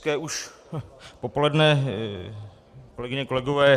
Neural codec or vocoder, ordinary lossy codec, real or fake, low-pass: none; Opus, 64 kbps; real; 14.4 kHz